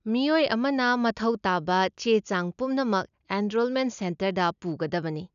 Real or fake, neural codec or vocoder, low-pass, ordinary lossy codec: real; none; 7.2 kHz; none